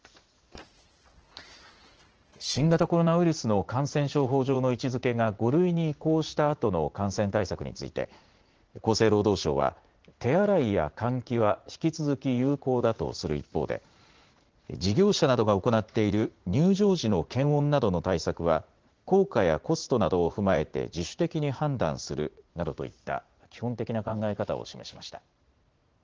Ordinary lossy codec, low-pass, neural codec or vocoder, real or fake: Opus, 16 kbps; 7.2 kHz; vocoder, 44.1 kHz, 80 mel bands, Vocos; fake